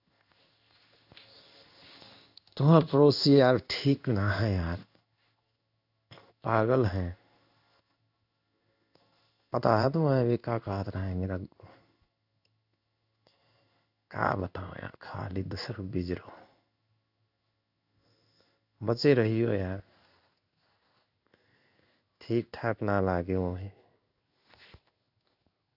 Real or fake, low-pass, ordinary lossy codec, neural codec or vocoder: fake; 5.4 kHz; none; codec, 16 kHz in and 24 kHz out, 1 kbps, XY-Tokenizer